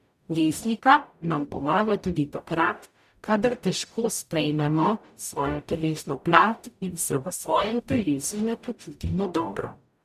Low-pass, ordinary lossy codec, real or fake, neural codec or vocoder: 14.4 kHz; none; fake; codec, 44.1 kHz, 0.9 kbps, DAC